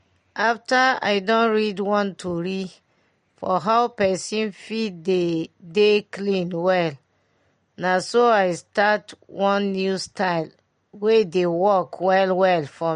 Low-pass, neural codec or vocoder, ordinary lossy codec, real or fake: 9.9 kHz; none; MP3, 48 kbps; real